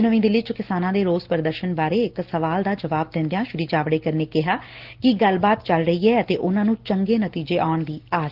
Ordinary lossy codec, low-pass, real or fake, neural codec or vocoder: Opus, 16 kbps; 5.4 kHz; real; none